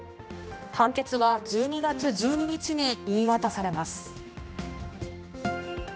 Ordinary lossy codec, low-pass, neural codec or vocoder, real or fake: none; none; codec, 16 kHz, 1 kbps, X-Codec, HuBERT features, trained on general audio; fake